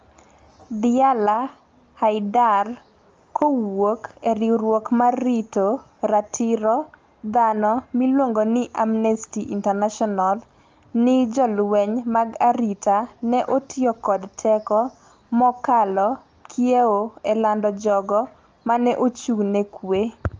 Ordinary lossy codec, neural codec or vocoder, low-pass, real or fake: Opus, 32 kbps; none; 7.2 kHz; real